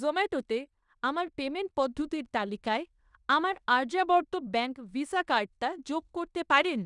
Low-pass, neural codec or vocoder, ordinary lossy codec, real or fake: 10.8 kHz; codec, 16 kHz in and 24 kHz out, 0.9 kbps, LongCat-Audio-Codec, fine tuned four codebook decoder; none; fake